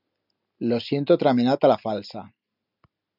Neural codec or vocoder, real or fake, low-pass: none; real; 5.4 kHz